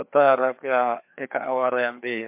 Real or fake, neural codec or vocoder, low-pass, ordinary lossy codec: fake; codec, 16 kHz, 2 kbps, FreqCodec, larger model; 3.6 kHz; MP3, 32 kbps